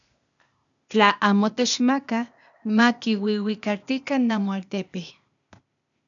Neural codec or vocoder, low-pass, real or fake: codec, 16 kHz, 0.8 kbps, ZipCodec; 7.2 kHz; fake